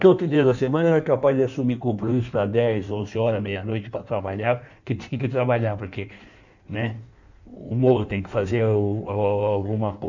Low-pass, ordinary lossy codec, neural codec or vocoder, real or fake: 7.2 kHz; none; codec, 16 kHz in and 24 kHz out, 1.1 kbps, FireRedTTS-2 codec; fake